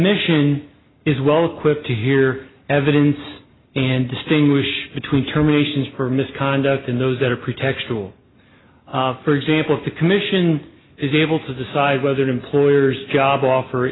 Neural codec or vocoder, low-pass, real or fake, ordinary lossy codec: none; 7.2 kHz; real; AAC, 16 kbps